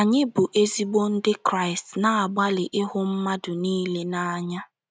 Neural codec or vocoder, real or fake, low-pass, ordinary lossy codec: none; real; none; none